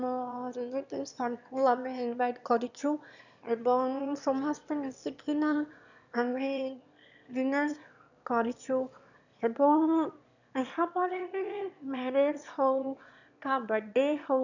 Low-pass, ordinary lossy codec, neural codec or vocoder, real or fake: 7.2 kHz; none; autoencoder, 22.05 kHz, a latent of 192 numbers a frame, VITS, trained on one speaker; fake